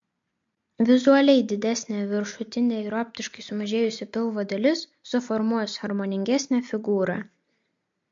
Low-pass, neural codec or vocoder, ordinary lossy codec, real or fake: 7.2 kHz; none; MP3, 48 kbps; real